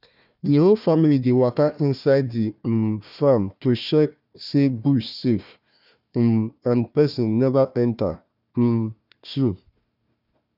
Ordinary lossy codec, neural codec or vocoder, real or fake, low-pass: none; codec, 16 kHz, 1 kbps, FunCodec, trained on Chinese and English, 50 frames a second; fake; 5.4 kHz